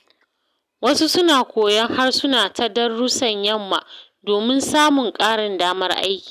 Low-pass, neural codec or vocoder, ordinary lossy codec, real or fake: 14.4 kHz; none; none; real